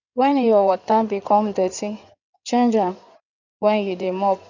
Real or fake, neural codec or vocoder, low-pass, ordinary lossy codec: fake; codec, 16 kHz in and 24 kHz out, 2.2 kbps, FireRedTTS-2 codec; 7.2 kHz; none